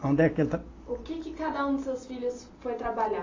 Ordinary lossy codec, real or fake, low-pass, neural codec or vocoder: AAC, 32 kbps; real; 7.2 kHz; none